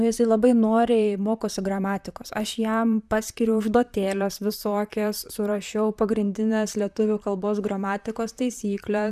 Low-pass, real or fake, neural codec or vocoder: 14.4 kHz; fake; vocoder, 44.1 kHz, 128 mel bands, Pupu-Vocoder